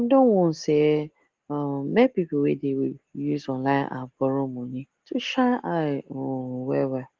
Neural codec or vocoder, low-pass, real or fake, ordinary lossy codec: none; 7.2 kHz; real; Opus, 16 kbps